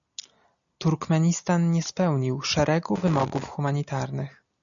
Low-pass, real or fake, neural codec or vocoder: 7.2 kHz; real; none